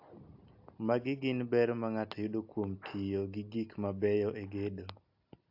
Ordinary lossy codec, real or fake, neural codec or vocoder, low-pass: AAC, 48 kbps; real; none; 5.4 kHz